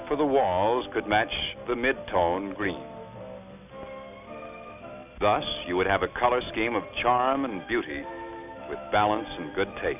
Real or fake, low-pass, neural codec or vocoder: real; 3.6 kHz; none